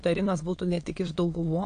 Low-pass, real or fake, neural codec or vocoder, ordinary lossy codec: 9.9 kHz; fake; autoencoder, 22.05 kHz, a latent of 192 numbers a frame, VITS, trained on many speakers; AAC, 48 kbps